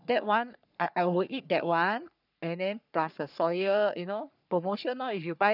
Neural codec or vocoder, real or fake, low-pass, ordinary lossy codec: codec, 44.1 kHz, 3.4 kbps, Pupu-Codec; fake; 5.4 kHz; none